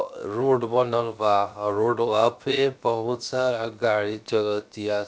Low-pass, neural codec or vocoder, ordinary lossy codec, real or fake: none; codec, 16 kHz, 0.7 kbps, FocalCodec; none; fake